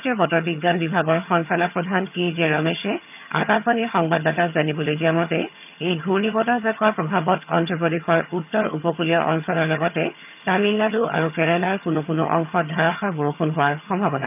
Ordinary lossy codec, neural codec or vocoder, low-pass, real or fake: none; vocoder, 22.05 kHz, 80 mel bands, HiFi-GAN; 3.6 kHz; fake